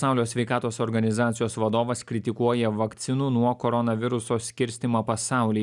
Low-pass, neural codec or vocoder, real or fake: 10.8 kHz; none; real